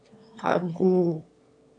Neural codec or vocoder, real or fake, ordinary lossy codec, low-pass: autoencoder, 22.05 kHz, a latent of 192 numbers a frame, VITS, trained on one speaker; fake; AAC, 48 kbps; 9.9 kHz